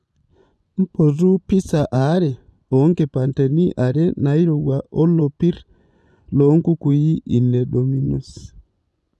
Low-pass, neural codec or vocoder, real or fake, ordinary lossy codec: none; vocoder, 24 kHz, 100 mel bands, Vocos; fake; none